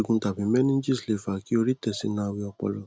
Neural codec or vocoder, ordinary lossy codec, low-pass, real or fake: none; none; none; real